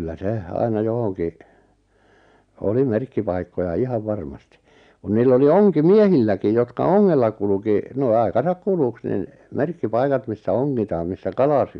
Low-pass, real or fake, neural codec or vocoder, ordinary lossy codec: 9.9 kHz; real; none; none